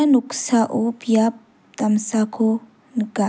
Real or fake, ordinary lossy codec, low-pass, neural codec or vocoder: real; none; none; none